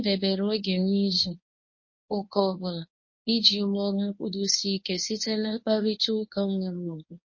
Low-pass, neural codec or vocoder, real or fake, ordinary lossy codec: 7.2 kHz; codec, 24 kHz, 0.9 kbps, WavTokenizer, medium speech release version 1; fake; MP3, 32 kbps